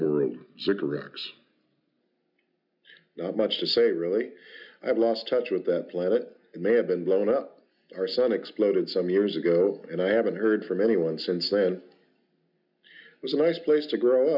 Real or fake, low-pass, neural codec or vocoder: real; 5.4 kHz; none